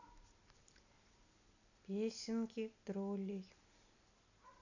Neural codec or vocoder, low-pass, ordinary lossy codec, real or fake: none; 7.2 kHz; none; real